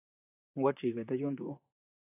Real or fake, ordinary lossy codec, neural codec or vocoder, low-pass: fake; AAC, 16 kbps; vocoder, 44.1 kHz, 128 mel bands, Pupu-Vocoder; 3.6 kHz